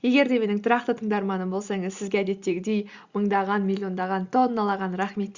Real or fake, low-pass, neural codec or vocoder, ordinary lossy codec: real; 7.2 kHz; none; Opus, 64 kbps